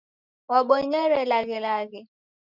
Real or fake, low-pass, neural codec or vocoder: real; 5.4 kHz; none